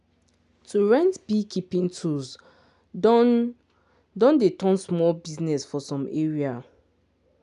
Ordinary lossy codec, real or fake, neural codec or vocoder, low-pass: none; real; none; 10.8 kHz